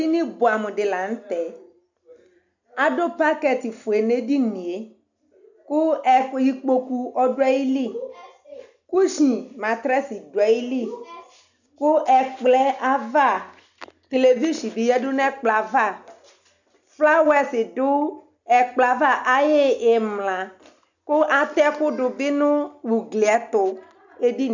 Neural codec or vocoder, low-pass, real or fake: none; 7.2 kHz; real